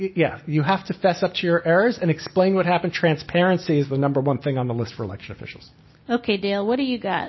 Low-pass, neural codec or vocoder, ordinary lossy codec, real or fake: 7.2 kHz; none; MP3, 24 kbps; real